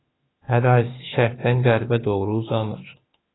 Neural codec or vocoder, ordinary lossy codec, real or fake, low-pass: autoencoder, 48 kHz, 32 numbers a frame, DAC-VAE, trained on Japanese speech; AAC, 16 kbps; fake; 7.2 kHz